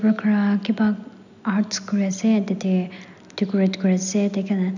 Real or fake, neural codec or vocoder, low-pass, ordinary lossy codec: real; none; 7.2 kHz; none